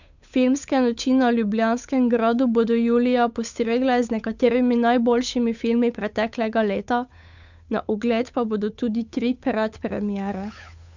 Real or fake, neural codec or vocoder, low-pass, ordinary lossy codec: fake; codec, 16 kHz, 8 kbps, FunCodec, trained on Chinese and English, 25 frames a second; 7.2 kHz; none